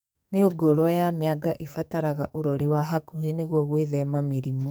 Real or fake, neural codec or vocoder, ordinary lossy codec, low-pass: fake; codec, 44.1 kHz, 2.6 kbps, SNAC; none; none